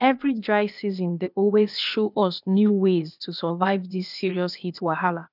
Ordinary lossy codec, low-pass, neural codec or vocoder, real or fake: none; 5.4 kHz; codec, 16 kHz, 0.8 kbps, ZipCodec; fake